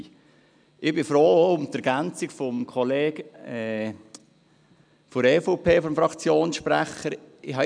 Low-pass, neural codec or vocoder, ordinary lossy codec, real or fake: 9.9 kHz; none; none; real